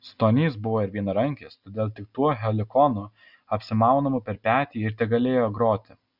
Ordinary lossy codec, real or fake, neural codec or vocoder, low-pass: Opus, 64 kbps; real; none; 5.4 kHz